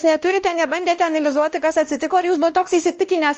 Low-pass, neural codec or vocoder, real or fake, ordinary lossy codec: 7.2 kHz; codec, 16 kHz, 1 kbps, X-Codec, WavLM features, trained on Multilingual LibriSpeech; fake; Opus, 24 kbps